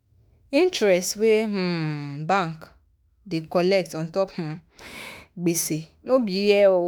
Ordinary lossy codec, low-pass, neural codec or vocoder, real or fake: none; none; autoencoder, 48 kHz, 32 numbers a frame, DAC-VAE, trained on Japanese speech; fake